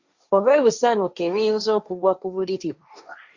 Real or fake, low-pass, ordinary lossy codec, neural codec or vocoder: fake; 7.2 kHz; Opus, 64 kbps; codec, 16 kHz, 1.1 kbps, Voila-Tokenizer